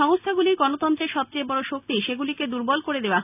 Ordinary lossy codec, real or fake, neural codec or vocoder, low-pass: none; real; none; 3.6 kHz